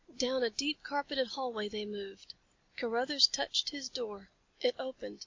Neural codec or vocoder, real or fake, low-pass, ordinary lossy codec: none; real; 7.2 kHz; MP3, 48 kbps